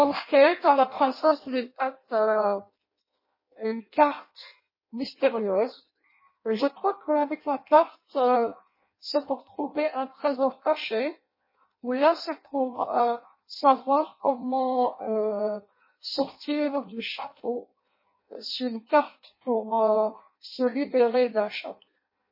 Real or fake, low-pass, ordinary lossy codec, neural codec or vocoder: fake; 5.4 kHz; MP3, 24 kbps; codec, 16 kHz in and 24 kHz out, 0.6 kbps, FireRedTTS-2 codec